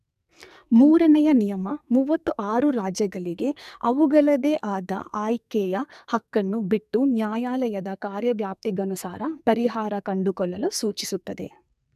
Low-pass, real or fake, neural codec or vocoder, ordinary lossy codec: 14.4 kHz; fake; codec, 44.1 kHz, 2.6 kbps, SNAC; none